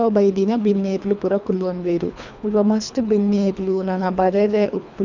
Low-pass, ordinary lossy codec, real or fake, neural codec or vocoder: 7.2 kHz; none; fake; codec, 24 kHz, 3 kbps, HILCodec